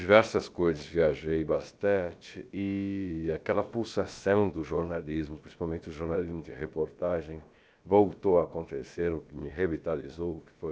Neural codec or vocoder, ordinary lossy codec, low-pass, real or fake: codec, 16 kHz, about 1 kbps, DyCAST, with the encoder's durations; none; none; fake